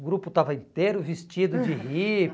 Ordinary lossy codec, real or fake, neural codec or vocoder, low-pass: none; real; none; none